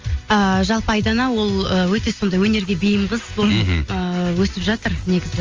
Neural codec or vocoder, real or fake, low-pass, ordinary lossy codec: none; real; 7.2 kHz; Opus, 32 kbps